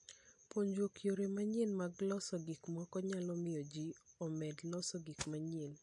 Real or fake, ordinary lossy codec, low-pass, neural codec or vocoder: real; MP3, 48 kbps; 10.8 kHz; none